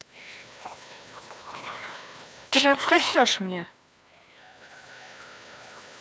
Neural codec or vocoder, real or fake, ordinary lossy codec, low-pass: codec, 16 kHz, 1 kbps, FreqCodec, larger model; fake; none; none